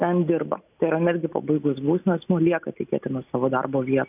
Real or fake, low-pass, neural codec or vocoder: real; 3.6 kHz; none